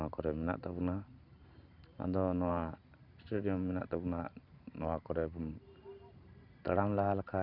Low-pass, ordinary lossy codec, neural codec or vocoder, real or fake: 5.4 kHz; none; vocoder, 44.1 kHz, 128 mel bands every 512 samples, BigVGAN v2; fake